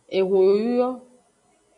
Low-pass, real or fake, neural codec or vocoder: 10.8 kHz; real; none